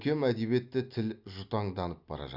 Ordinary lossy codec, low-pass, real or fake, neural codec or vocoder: Opus, 64 kbps; 5.4 kHz; real; none